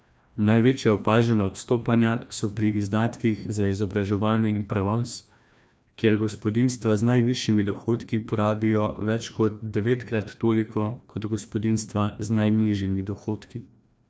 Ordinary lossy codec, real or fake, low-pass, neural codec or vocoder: none; fake; none; codec, 16 kHz, 1 kbps, FreqCodec, larger model